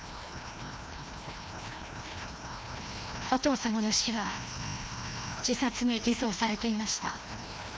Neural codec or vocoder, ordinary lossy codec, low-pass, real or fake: codec, 16 kHz, 1 kbps, FreqCodec, larger model; none; none; fake